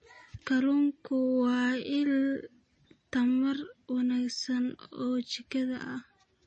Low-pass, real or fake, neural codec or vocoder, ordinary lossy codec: 10.8 kHz; real; none; MP3, 32 kbps